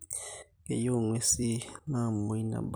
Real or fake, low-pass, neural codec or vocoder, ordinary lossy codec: fake; none; vocoder, 44.1 kHz, 128 mel bands every 256 samples, BigVGAN v2; none